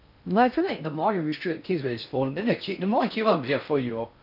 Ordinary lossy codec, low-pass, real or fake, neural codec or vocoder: none; 5.4 kHz; fake; codec, 16 kHz in and 24 kHz out, 0.6 kbps, FocalCodec, streaming, 4096 codes